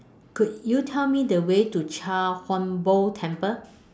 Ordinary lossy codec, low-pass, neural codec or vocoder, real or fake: none; none; none; real